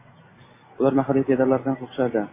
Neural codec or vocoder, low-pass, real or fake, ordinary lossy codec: none; 3.6 kHz; real; MP3, 16 kbps